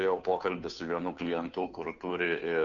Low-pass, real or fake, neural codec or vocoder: 7.2 kHz; fake; codec, 16 kHz, 2 kbps, FunCodec, trained on Chinese and English, 25 frames a second